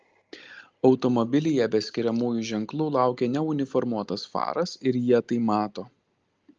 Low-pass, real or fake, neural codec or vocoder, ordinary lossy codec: 7.2 kHz; real; none; Opus, 24 kbps